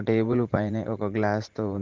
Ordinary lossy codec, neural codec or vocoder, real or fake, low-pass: Opus, 16 kbps; vocoder, 44.1 kHz, 80 mel bands, Vocos; fake; 7.2 kHz